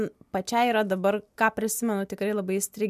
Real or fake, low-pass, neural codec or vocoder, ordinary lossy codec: real; 14.4 kHz; none; MP3, 96 kbps